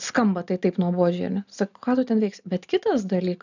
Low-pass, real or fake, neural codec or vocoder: 7.2 kHz; real; none